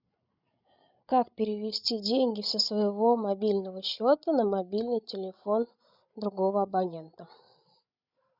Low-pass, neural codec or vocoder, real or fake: 5.4 kHz; codec, 16 kHz, 16 kbps, FreqCodec, larger model; fake